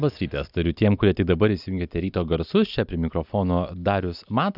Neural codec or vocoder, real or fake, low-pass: none; real; 5.4 kHz